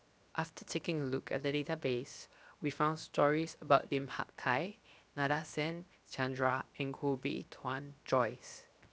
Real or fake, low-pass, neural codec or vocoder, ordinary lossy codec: fake; none; codec, 16 kHz, 0.7 kbps, FocalCodec; none